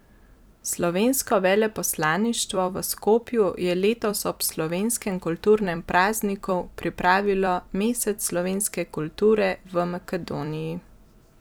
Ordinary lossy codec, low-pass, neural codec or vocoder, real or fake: none; none; vocoder, 44.1 kHz, 128 mel bands every 256 samples, BigVGAN v2; fake